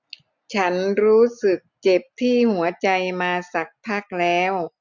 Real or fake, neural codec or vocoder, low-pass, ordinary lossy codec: real; none; 7.2 kHz; none